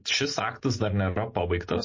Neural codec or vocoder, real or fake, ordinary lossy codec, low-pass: none; real; MP3, 32 kbps; 7.2 kHz